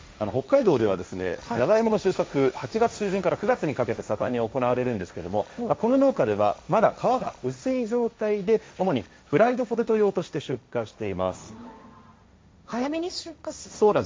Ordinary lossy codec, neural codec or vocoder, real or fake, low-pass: none; codec, 16 kHz, 1.1 kbps, Voila-Tokenizer; fake; none